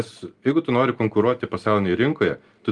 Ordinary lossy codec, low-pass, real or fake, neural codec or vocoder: Opus, 32 kbps; 10.8 kHz; real; none